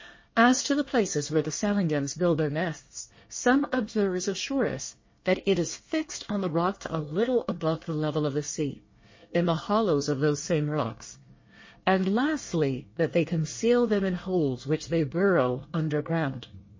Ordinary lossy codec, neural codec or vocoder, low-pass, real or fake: MP3, 32 kbps; codec, 24 kHz, 1 kbps, SNAC; 7.2 kHz; fake